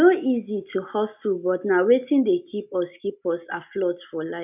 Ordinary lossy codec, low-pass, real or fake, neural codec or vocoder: none; 3.6 kHz; real; none